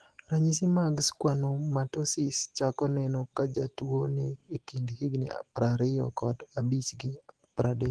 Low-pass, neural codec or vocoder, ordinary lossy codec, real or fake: 10.8 kHz; autoencoder, 48 kHz, 128 numbers a frame, DAC-VAE, trained on Japanese speech; Opus, 16 kbps; fake